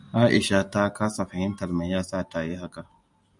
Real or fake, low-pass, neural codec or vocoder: real; 10.8 kHz; none